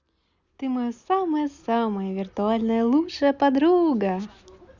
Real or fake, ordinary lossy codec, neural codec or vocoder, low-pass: real; Opus, 64 kbps; none; 7.2 kHz